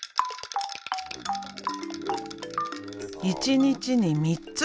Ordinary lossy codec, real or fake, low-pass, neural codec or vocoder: none; real; none; none